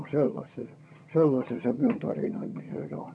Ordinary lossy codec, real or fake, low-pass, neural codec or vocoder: none; fake; none; vocoder, 22.05 kHz, 80 mel bands, HiFi-GAN